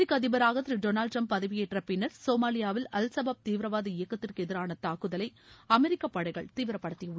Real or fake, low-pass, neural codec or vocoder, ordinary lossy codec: real; none; none; none